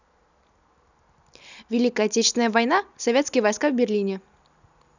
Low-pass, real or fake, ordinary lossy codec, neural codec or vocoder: 7.2 kHz; real; none; none